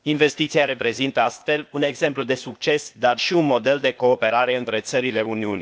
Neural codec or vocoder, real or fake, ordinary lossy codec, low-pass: codec, 16 kHz, 0.8 kbps, ZipCodec; fake; none; none